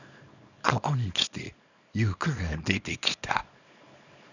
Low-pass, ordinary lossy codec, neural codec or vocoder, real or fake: 7.2 kHz; none; codec, 24 kHz, 0.9 kbps, WavTokenizer, small release; fake